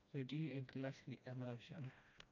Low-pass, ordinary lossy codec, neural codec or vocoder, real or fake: 7.2 kHz; none; codec, 16 kHz, 1 kbps, FreqCodec, smaller model; fake